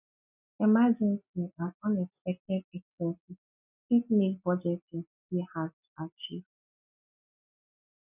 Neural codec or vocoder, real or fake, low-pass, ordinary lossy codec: none; real; 3.6 kHz; none